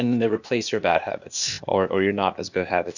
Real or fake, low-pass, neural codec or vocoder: fake; 7.2 kHz; codec, 16 kHz, 0.8 kbps, ZipCodec